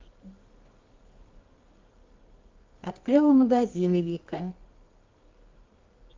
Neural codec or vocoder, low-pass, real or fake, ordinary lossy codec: codec, 24 kHz, 0.9 kbps, WavTokenizer, medium music audio release; 7.2 kHz; fake; Opus, 24 kbps